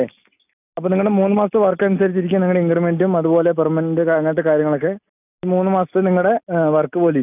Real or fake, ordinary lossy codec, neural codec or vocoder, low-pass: real; none; none; 3.6 kHz